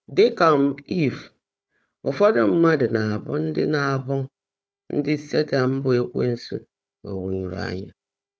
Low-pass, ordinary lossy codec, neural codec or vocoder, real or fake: none; none; codec, 16 kHz, 4 kbps, FunCodec, trained on Chinese and English, 50 frames a second; fake